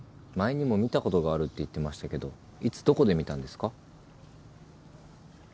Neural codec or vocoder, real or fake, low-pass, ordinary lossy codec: none; real; none; none